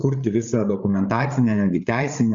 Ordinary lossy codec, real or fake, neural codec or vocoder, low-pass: Opus, 64 kbps; fake; codec, 16 kHz, 8 kbps, FreqCodec, larger model; 7.2 kHz